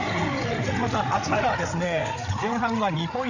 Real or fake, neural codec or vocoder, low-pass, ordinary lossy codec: fake; codec, 16 kHz, 8 kbps, FreqCodec, larger model; 7.2 kHz; none